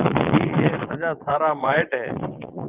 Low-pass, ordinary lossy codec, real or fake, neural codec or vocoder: 3.6 kHz; Opus, 32 kbps; fake; vocoder, 22.05 kHz, 80 mel bands, Vocos